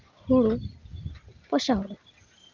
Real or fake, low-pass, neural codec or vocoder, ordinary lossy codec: real; 7.2 kHz; none; Opus, 24 kbps